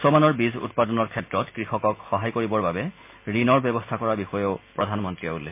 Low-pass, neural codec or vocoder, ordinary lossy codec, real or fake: 3.6 kHz; none; MP3, 24 kbps; real